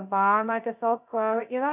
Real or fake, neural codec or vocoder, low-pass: fake; codec, 16 kHz, 0.2 kbps, FocalCodec; 3.6 kHz